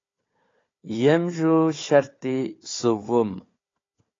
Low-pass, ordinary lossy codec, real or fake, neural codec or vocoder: 7.2 kHz; AAC, 32 kbps; fake; codec, 16 kHz, 4 kbps, FunCodec, trained on Chinese and English, 50 frames a second